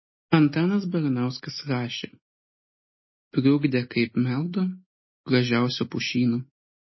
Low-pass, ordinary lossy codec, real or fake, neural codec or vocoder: 7.2 kHz; MP3, 24 kbps; real; none